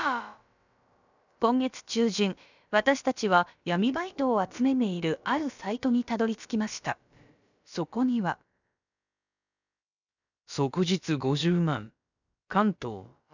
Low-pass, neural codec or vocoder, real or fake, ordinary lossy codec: 7.2 kHz; codec, 16 kHz, about 1 kbps, DyCAST, with the encoder's durations; fake; none